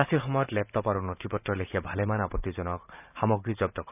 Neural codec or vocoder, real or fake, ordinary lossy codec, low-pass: vocoder, 44.1 kHz, 128 mel bands every 512 samples, BigVGAN v2; fake; none; 3.6 kHz